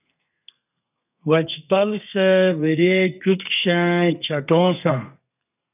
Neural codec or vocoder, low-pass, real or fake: codec, 32 kHz, 1.9 kbps, SNAC; 3.6 kHz; fake